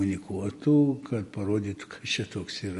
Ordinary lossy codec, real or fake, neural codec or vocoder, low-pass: MP3, 48 kbps; real; none; 14.4 kHz